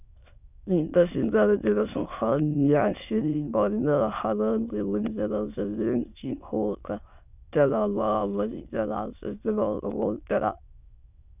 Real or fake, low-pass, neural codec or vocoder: fake; 3.6 kHz; autoencoder, 22.05 kHz, a latent of 192 numbers a frame, VITS, trained on many speakers